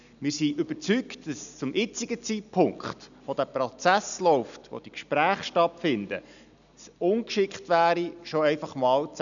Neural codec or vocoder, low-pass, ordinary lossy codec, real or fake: none; 7.2 kHz; none; real